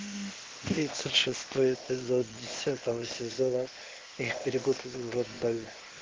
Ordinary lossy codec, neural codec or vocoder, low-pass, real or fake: Opus, 16 kbps; codec, 16 kHz, 0.8 kbps, ZipCodec; 7.2 kHz; fake